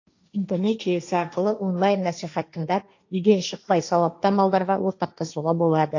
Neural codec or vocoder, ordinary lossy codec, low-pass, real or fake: codec, 16 kHz, 1.1 kbps, Voila-Tokenizer; AAC, 48 kbps; 7.2 kHz; fake